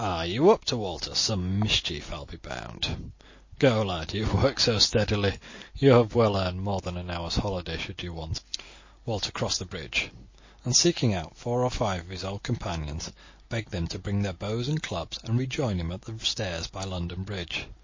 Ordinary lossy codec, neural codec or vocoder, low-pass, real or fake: MP3, 32 kbps; none; 7.2 kHz; real